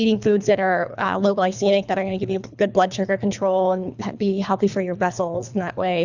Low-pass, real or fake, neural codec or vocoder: 7.2 kHz; fake; codec, 24 kHz, 3 kbps, HILCodec